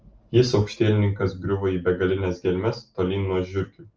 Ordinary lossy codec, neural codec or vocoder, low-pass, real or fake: Opus, 24 kbps; none; 7.2 kHz; real